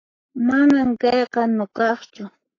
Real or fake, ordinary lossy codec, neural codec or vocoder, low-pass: fake; AAC, 32 kbps; codec, 44.1 kHz, 7.8 kbps, Pupu-Codec; 7.2 kHz